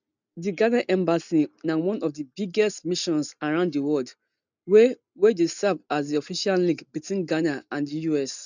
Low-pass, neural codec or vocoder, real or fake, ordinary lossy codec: 7.2 kHz; none; real; none